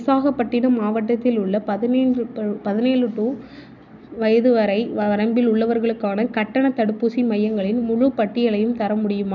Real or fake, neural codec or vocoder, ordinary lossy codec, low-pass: real; none; none; 7.2 kHz